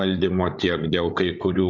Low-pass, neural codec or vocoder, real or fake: 7.2 kHz; codec, 16 kHz, 8 kbps, FreqCodec, larger model; fake